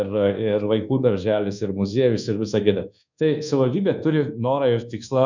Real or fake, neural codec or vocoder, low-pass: fake; codec, 24 kHz, 1.2 kbps, DualCodec; 7.2 kHz